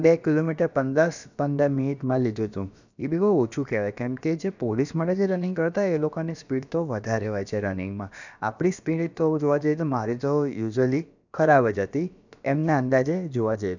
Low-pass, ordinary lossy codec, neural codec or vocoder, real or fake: 7.2 kHz; none; codec, 16 kHz, about 1 kbps, DyCAST, with the encoder's durations; fake